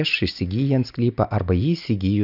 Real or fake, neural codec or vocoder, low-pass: real; none; 5.4 kHz